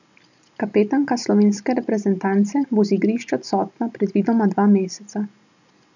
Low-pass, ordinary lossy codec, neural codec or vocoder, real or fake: 7.2 kHz; none; none; real